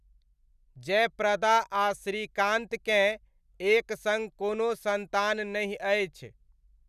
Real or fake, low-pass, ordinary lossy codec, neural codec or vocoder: real; 14.4 kHz; none; none